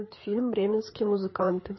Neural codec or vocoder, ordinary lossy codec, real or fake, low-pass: codec, 16 kHz, 4 kbps, FreqCodec, larger model; MP3, 24 kbps; fake; 7.2 kHz